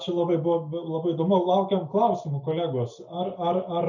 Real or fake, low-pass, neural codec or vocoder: real; 7.2 kHz; none